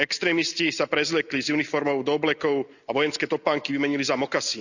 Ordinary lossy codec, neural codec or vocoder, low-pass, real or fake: none; none; 7.2 kHz; real